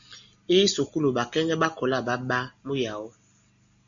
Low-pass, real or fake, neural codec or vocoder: 7.2 kHz; real; none